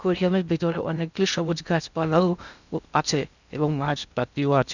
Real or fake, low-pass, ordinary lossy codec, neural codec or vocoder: fake; 7.2 kHz; none; codec, 16 kHz in and 24 kHz out, 0.6 kbps, FocalCodec, streaming, 2048 codes